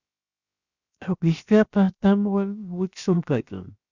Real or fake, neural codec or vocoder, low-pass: fake; codec, 16 kHz, 0.7 kbps, FocalCodec; 7.2 kHz